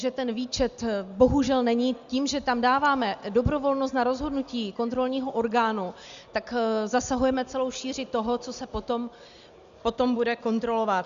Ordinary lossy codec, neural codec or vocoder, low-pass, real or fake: Opus, 64 kbps; none; 7.2 kHz; real